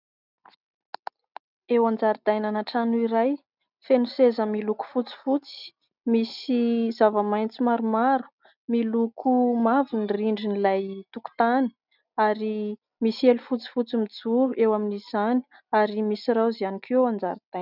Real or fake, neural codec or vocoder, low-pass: real; none; 5.4 kHz